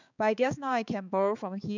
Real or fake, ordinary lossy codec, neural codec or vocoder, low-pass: fake; none; codec, 16 kHz, 4 kbps, X-Codec, HuBERT features, trained on balanced general audio; 7.2 kHz